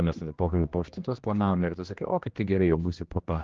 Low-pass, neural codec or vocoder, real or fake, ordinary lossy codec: 7.2 kHz; codec, 16 kHz, 1 kbps, X-Codec, HuBERT features, trained on general audio; fake; Opus, 16 kbps